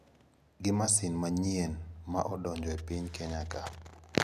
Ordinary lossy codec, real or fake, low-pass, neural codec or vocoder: none; real; 14.4 kHz; none